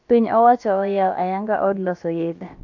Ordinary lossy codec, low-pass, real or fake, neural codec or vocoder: AAC, 48 kbps; 7.2 kHz; fake; codec, 16 kHz, about 1 kbps, DyCAST, with the encoder's durations